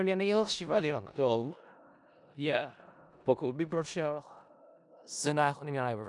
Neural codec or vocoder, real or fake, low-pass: codec, 16 kHz in and 24 kHz out, 0.4 kbps, LongCat-Audio-Codec, four codebook decoder; fake; 10.8 kHz